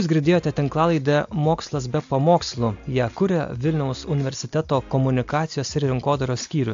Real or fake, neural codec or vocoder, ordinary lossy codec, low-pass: real; none; AAC, 64 kbps; 7.2 kHz